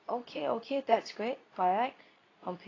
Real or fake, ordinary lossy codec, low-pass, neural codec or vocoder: fake; AAC, 32 kbps; 7.2 kHz; codec, 24 kHz, 0.9 kbps, WavTokenizer, medium speech release version 2